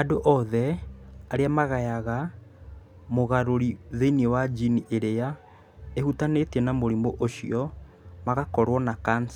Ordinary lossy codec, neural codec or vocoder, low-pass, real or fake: none; none; 19.8 kHz; real